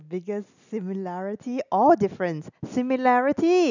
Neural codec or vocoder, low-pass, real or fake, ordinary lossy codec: none; 7.2 kHz; real; none